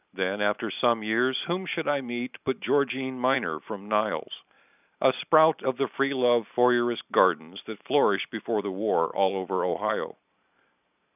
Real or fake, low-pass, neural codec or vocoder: fake; 3.6 kHz; vocoder, 44.1 kHz, 128 mel bands every 512 samples, BigVGAN v2